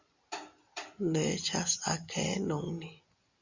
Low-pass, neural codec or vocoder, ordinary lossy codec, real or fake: 7.2 kHz; none; Opus, 64 kbps; real